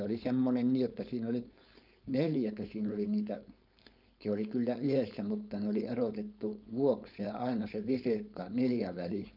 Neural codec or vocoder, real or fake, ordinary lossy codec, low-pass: codec, 16 kHz, 4.8 kbps, FACodec; fake; none; 5.4 kHz